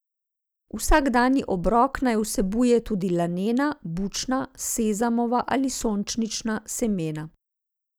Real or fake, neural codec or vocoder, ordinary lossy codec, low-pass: real; none; none; none